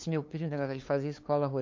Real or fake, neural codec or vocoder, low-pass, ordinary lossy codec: fake; codec, 16 kHz, 2 kbps, FunCodec, trained on LibriTTS, 25 frames a second; 7.2 kHz; MP3, 48 kbps